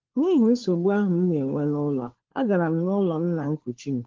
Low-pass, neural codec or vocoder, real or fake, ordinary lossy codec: 7.2 kHz; codec, 16 kHz, 4 kbps, FunCodec, trained on LibriTTS, 50 frames a second; fake; Opus, 16 kbps